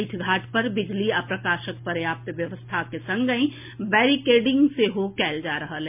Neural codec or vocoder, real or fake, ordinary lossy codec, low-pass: none; real; MP3, 32 kbps; 3.6 kHz